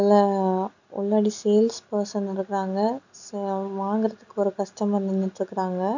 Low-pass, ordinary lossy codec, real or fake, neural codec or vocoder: 7.2 kHz; none; real; none